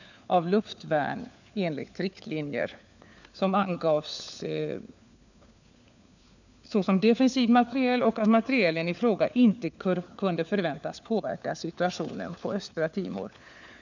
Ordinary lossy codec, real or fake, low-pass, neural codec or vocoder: none; fake; 7.2 kHz; codec, 16 kHz, 4 kbps, FunCodec, trained on LibriTTS, 50 frames a second